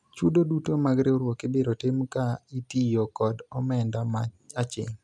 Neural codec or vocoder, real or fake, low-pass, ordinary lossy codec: none; real; none; none